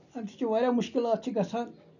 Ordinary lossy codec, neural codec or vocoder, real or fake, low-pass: none; none; real; 7.2 kHz